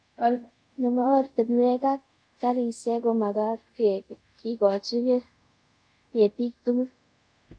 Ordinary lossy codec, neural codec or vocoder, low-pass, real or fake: none; codec, 24 kHz, 0.5 kbps, DualCodec; 9.9 kHz; fake